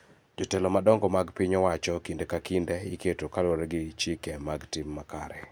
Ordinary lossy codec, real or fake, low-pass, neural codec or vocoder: none; real; none; none